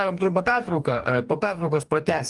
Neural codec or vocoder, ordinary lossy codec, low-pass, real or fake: codec, 44.1 kHz, 2.6 kbps, DAC; Opus, 32 kbps; 10.8 kHz; fake